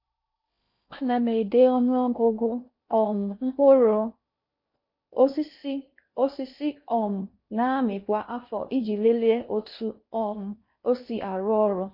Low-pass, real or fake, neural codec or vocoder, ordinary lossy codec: 5.4 kHz; fake; codec, 16 kHz in and 24 kHz out, 0.8 kbps, FocalCodec, streaming, 65536 codes; MP3, 32 kbps